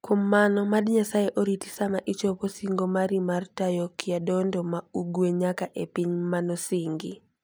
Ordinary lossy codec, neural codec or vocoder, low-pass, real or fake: none; none; none; real